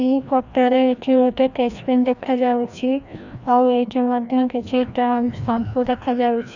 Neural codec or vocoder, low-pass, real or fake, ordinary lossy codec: codec, 16 kHz, 1 kbps, FreqCodec, larger model; 7.2 kHz; fake; none